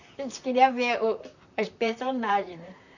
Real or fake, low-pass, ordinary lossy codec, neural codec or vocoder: fake; 7.2 kHz; none; vocoder, 44.1 kHz, 128 mel bands, Pupu-Vocoder